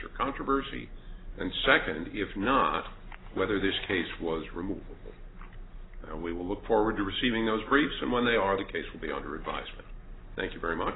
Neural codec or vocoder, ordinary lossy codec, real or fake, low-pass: none; AAC, 16 kbps; real; 7.2 kHz